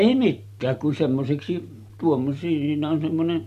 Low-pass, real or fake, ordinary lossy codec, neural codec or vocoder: 14.4 kHz; fake; none; vocoder, 44.1 kHz, 128 mel bands every 256 samples, BigVGAN v2